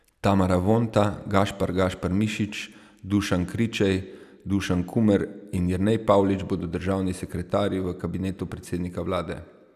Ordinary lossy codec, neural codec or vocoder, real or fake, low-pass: none; none; real; 14.4 kHz